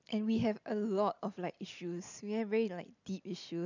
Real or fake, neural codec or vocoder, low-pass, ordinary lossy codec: real; none; 7.2 kHz; none